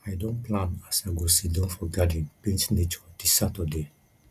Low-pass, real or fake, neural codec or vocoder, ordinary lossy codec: 14.4 kHz; real; none; Opus, 64 kbps